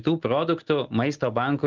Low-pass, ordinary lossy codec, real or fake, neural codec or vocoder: 7.2 kHz; Opus, 16 kbps; real; none